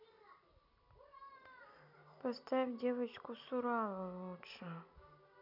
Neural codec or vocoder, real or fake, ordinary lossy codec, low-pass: none; real; none; 5.4 kHz